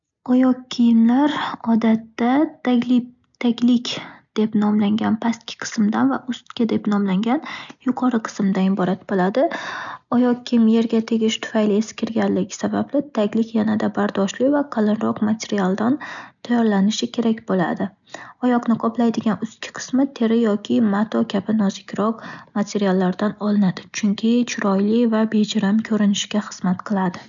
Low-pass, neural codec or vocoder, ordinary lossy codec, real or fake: 7.2 kHz; none; none; real